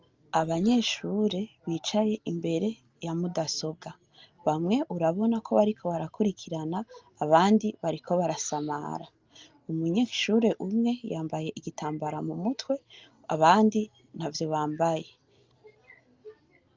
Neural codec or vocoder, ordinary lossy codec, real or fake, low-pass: none; Opus, 24 kbps; real; 7.2 kHz